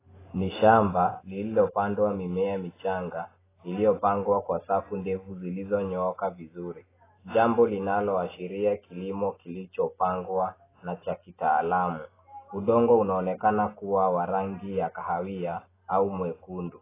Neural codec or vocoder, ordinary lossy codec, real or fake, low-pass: none; AAC, 16 kbps; real; 3.6 kHz